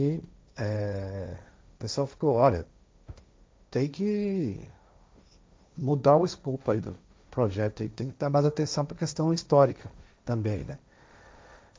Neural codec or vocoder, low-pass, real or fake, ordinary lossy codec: codec, 16 kHz, 1.1 kbps, Voila-Tokenizer; none; fake; none